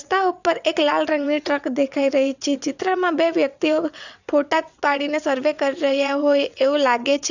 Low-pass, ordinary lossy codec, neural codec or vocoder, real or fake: 7.2 kHz; none; vocoder, 22.05 kHz, 80 mel bands, WaveNeXt; fake